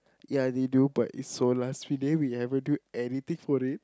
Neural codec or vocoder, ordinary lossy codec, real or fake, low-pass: none; none; real; none